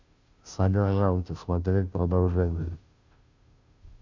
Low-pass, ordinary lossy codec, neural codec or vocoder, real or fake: 7.2 kHz; Opus, 64 kbps; codec, 16 kHz, 0.5 kbps, FunCodec, trained on Chinese and English, 25 frames a second; fake